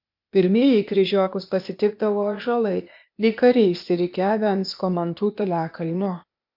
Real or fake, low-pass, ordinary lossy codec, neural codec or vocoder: fake; 5.4 kHz; MP3, 48 kbps; codec, 16 kHz, 0.8 kbps, ZipCodec